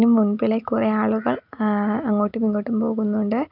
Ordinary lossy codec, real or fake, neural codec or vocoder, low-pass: none; real; none; 5.4 kHz